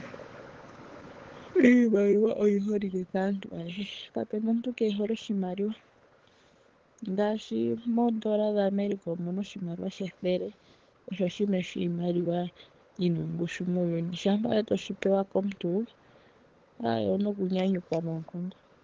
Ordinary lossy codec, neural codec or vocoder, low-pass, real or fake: Opus, 16 kbps; codec, 16 kHz, 16 kbps, FunCodec, trained on LibriTTS, 50 frames a second; 7.2 kHz; fake